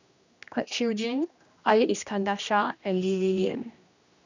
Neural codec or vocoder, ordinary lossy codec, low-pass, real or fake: codec, 16 kHz, 1 kbps, X-Codec, HuBERT features, trained on general audio; none; 7.2 kHz; fake